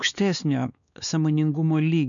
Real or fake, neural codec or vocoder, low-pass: fake; codec, 16 kHz, 2 kbps, X-Codec, WavLM features, trained on Multilingual LibriSpeech; 7.2 kHz